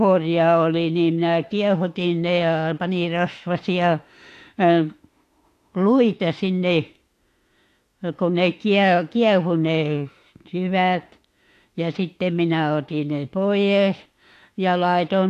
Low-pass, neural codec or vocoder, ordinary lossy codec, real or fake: 14.4 kHz; autoencoder, 48 kHz, 32 numbers a frame, DAC-VAE, trained on Japanese speech; AAC, 64 kbps; fake